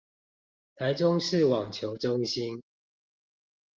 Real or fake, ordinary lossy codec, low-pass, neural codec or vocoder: real; Opus, 32 kbps; 7.2 kHz; none